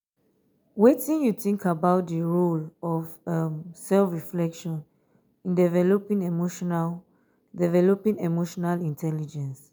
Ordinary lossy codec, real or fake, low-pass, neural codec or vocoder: none; real; none; none